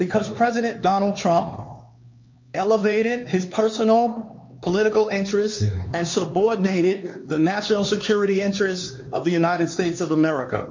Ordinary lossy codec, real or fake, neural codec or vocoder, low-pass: MP3, 48 kbps; fake; codec, 16 kHz, 2 kbps, X-Codec, HuBERT features, trained on LibriSpeech; 7.2 kHz